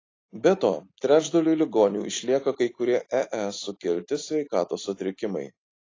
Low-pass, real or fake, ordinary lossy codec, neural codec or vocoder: 7.2 kHz; real; AAC, 32 kbps; none